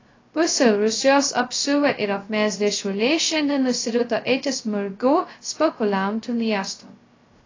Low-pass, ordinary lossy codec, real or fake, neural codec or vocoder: 7.2 kHz; AAC, 32 kbps; fake; codec, 16 kHz, 0.2 kbps, FocalCodec